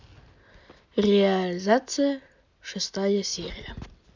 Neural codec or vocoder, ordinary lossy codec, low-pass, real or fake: none; MP3, 64 kbps; 7.2 kHz; real